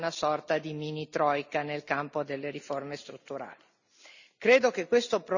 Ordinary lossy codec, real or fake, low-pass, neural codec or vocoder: none; real; 7.2 kHz; none